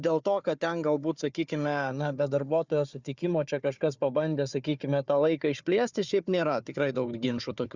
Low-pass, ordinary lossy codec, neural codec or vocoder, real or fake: 7.2 kHz; Opus, 64 kbps; codec, 16 kHz, 4 kbps, FunCodec, trained on Chinese and English, 50 frames a second; fake